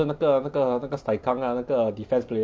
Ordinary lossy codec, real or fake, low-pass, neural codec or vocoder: none; real; none; none